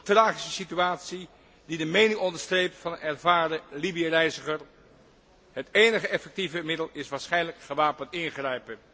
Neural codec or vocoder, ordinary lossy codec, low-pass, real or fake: none; none; none; real